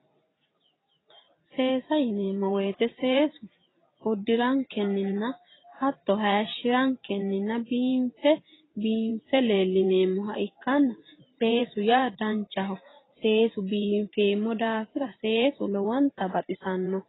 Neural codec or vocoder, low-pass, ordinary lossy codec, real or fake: vocoder, 44.1 kHz, 128 mel bands every 512 samples, BigVGAN v2; 7.2 kHz; AAC, 16 kbps; fake